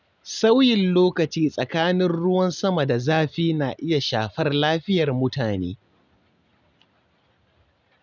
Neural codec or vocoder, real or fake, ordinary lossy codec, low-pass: none; real; none; 7.2 kHz